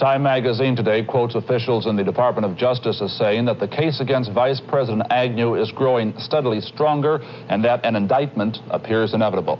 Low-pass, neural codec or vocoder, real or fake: 7.2 kHz; none; real